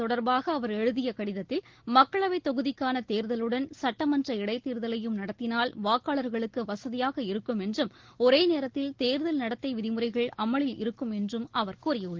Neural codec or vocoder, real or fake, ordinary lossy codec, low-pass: none; real; Opus, 16 kbps; 7.2 kHz